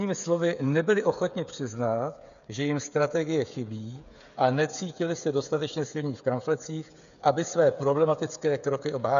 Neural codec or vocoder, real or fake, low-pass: codec, 16 kHz, 8 kbps, FreqCodec, smaller model; fake; 7.2 kHz